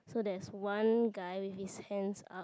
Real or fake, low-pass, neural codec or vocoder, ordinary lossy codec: real; none; none; none